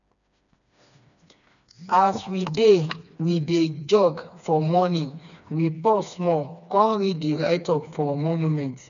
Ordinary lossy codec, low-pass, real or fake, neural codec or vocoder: none; 7.2 kHz; fake; codec, 16 kHz, 2 kbps, FreqCodec, smaller model